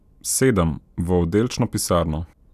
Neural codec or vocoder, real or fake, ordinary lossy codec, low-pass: none; real; none; 14.4 kHz